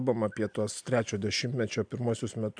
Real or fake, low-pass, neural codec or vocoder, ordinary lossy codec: real; 9.9 kHz; none; AAC, 64 kbps